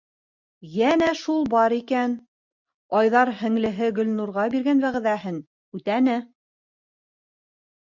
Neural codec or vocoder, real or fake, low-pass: none; real; 7.2 kHz